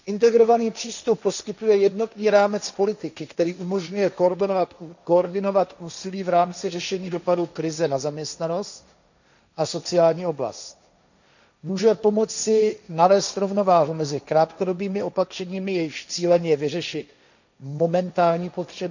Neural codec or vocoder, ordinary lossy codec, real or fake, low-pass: codec, 16 kHz, 1.1 kbps, Voila-Tokenizer; none; fake; 7.2 kHz